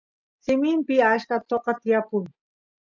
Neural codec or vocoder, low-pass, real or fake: none; 7.2 kHz; real